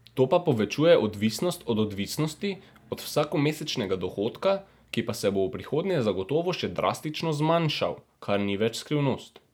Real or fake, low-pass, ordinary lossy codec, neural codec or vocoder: real; none; none; none